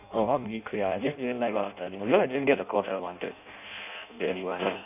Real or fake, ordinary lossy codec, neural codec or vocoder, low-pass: fake; none; codec, 16 kHz in and 24 kHz out, 0.6 kbps, FireRedTTS-2 codec; 3.6 kHz